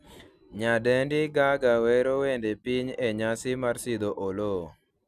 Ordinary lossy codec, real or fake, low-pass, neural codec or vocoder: none; real; 14.4 kHz; none